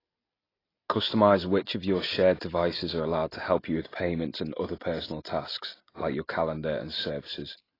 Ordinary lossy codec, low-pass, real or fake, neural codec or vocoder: AAC, 24 kbps; 5.4 kHz; real; none